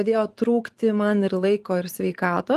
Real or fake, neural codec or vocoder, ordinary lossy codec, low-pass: fake; vocoder, 44.1 kHz, 128 mel bands every 512 samples, BigVGAN v2; Opus, 24 kbps; 14.4 kHz